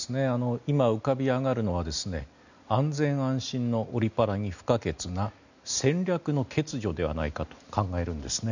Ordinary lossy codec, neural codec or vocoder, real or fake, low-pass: none; none; real; 7.2 kHz